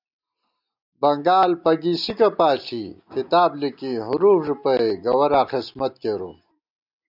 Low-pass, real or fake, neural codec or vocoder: 5.4 kHz; real; none